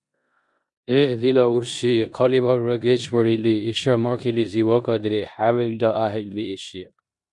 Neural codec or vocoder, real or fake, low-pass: codec, 16 kHz in and 24 kHz out, 0.9 kbps, LongCat-Audio-Codec, four codebook decoder; fake; 10.8 kHz